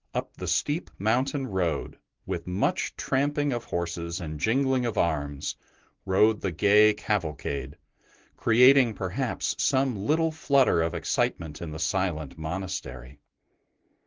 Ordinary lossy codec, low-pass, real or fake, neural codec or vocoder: Opus, 16 kbps; 7.2 kHz; real; none